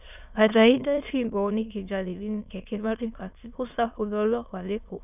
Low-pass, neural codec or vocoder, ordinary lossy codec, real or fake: 3.6 kHz; autoencoder, 22.05 kHz, a latent of 192 numbers a frame, VITS, trained on many speakers; none; fake